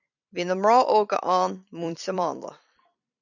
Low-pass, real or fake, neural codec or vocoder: 7.2 kHz; fake; vocoder, 44.1 kHz, 128 mel bands every 256 samples, BigVGAN v2